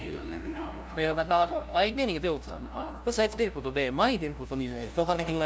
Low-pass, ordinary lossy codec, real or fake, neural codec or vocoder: none; none; fake; codec, 16 kHz, 0.5 kbps, FunCodec, trained on LibriTTS, 25 frames a second